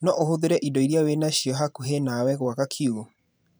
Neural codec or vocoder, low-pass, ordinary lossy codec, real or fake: vocoder, 44.1 kHz, 128 mel bands every 512 samples, BigVGAN v2; none; none; fake